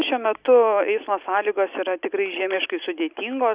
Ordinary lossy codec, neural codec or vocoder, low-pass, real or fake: Opus, 64 kbps; none; 3.6 kHz; real